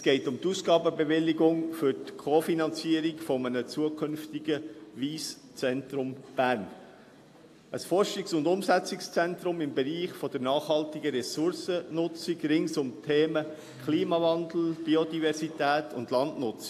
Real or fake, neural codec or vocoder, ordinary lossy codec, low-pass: real; none; AAC, 64 kbps; 14.4 kHz